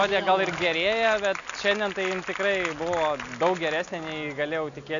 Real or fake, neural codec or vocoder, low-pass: real; none; 7.2 kHz